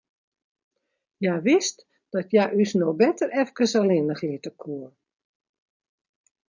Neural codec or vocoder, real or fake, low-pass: none; real; 7.2 kHz